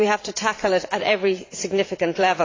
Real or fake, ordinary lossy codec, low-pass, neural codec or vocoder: fake; AAC, 32 kbps; 7.2 kHz; vocoder, 44.1 kHz, 80 mel bands, Vocos